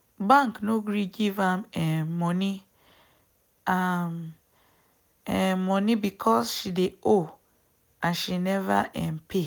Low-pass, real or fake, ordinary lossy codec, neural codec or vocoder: none; real; none; none